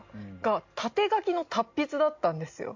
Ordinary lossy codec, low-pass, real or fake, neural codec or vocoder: MP3, 48 kbps; 7.2 kHz; real; none